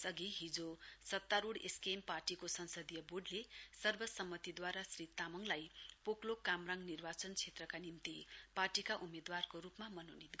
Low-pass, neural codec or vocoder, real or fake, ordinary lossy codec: none; none; real; none